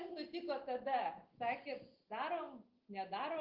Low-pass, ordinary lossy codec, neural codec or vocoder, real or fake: 5.4 kHz; Opus, 16 kbps; none; real